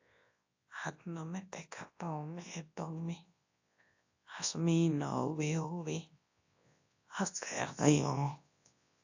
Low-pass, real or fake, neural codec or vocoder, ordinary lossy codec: 7.2 kHz; fake; codec, 24 kHz, 0.9 kbps, WavTokenizer, large speech release; AAC, 48 kbps